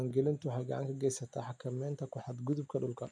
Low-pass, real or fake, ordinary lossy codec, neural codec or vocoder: 9.9 kHz; real; none; none